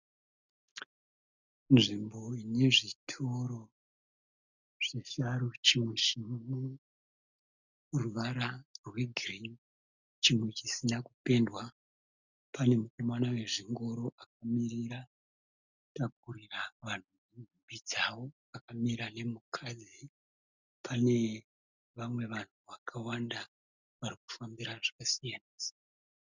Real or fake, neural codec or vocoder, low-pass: real; none; 7.2 kHz